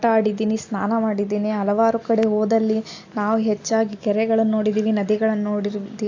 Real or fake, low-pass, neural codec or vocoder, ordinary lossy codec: real; 7.2 kHz; none; AAC, 48 kbps